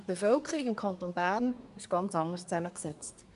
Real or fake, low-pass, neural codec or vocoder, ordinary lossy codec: fake; 10.8 kHz; codec, 24 kHz, 1 kbps, SNAC; none